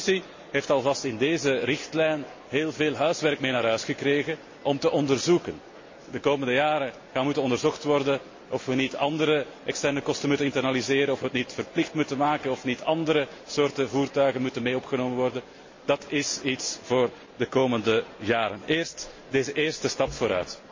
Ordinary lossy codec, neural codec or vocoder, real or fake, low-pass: MP3, 32 kbps; none; real; 7.2 kHz